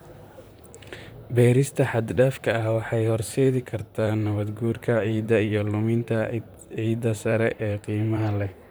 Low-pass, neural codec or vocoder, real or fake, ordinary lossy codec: none; vocoder, 44.1 kHz, 128 mel bands, Pupu-Vocoder; fake; none